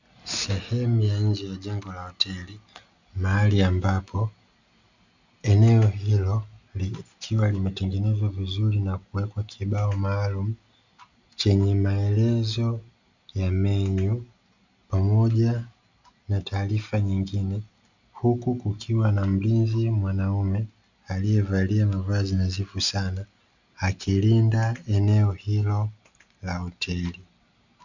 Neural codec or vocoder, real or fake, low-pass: none; real; 7.2 kHz